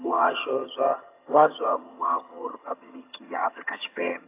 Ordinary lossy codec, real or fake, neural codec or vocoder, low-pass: AAC, 24 kbps; fake; vocoder, 22.05 kHz, 80 mel bands, HiFi-GAN; 3.6 kHz